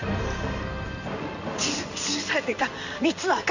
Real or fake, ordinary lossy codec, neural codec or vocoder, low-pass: fake; none; codec, 16 kHz in and 24 kHz out, 1 kbps, XY-Tokenizer; 7.2 kHz